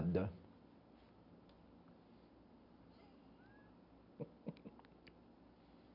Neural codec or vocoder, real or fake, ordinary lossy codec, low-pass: none; real; none; 5.4 kHz